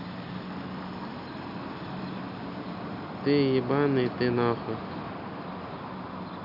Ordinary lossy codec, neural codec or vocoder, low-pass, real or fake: none; none; 5.4 kHz; real